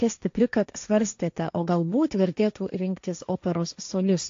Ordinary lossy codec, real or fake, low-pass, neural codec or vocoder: AAC, 48 kbps; fake; 7.2 kHz; codec, 16 kHz, 1.1 kbps, Voila-Tokenizer